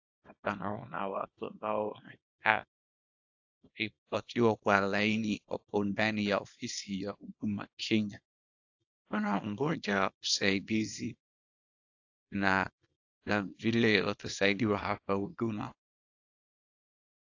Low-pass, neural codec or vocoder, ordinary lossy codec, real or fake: 7.2 kHz; codec, 24 kHz, 0.9 kbps, WavTokenizer, small release; AAC, 48 kbps; fake